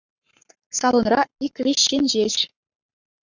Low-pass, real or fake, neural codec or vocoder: 7.2 kHz; fake; codec, 44.1 kHz, 7.8 kbps, Pupu-Codec